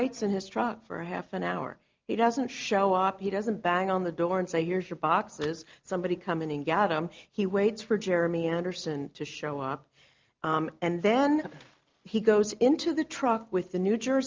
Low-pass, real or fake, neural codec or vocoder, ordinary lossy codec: 7.2 kHz; real; none; Opus, 32 kbps